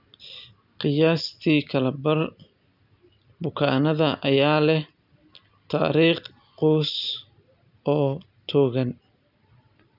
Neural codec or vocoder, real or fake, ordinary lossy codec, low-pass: none; real; none; 5.4 kHz